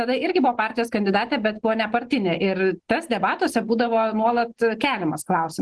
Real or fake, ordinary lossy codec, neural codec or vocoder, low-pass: real; Opus, 16 kbps; none; 10.8 kHz